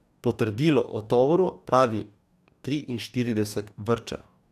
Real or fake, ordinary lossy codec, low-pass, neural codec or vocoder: fake; none; 14.4 kHz; codec, 44.1 kHz, 2.6 kbps, DAC